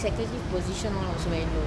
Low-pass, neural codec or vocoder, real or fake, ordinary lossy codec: none; none; real; none